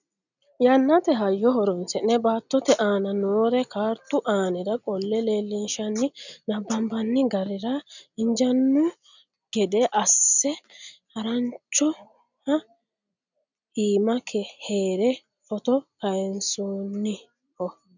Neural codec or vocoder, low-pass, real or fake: none; 7.2 kHz; real